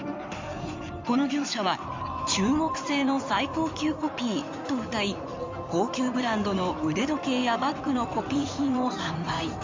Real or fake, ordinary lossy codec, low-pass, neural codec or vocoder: fake; MP3, 64 kbps; 7.2 kHz; codec, 16 kHz in and 24 kHz out, 2.2 kbps, FireRedTTS-2 codec